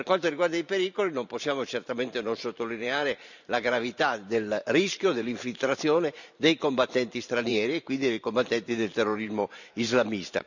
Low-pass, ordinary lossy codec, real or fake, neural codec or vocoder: 7.2 kHz; none; fake; vocoder, 44.1 kHz, 128 mel bands every 512 samples, BigVGAN v2